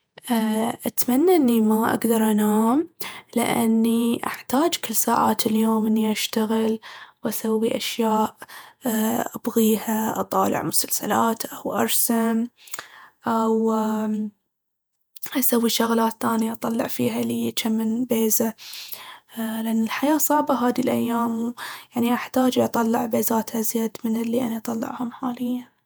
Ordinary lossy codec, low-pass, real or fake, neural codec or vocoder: none; none; fake; vocoder, 48 kHz, 128 mel bands, Vocos